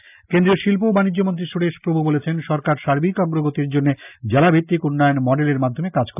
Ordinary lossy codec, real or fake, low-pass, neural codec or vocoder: none; real; 3.6 kHz; none